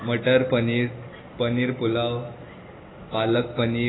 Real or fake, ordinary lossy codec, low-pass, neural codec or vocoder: real; AAC, 16 kbps; 7.2 kHz; none